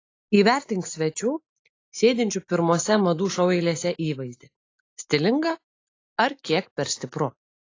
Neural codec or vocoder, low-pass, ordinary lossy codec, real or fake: none; 7.2 kHz; AAC, 32 kbps; real